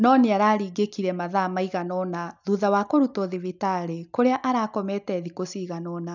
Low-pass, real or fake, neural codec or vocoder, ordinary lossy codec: 7.2 kHz; real; none; none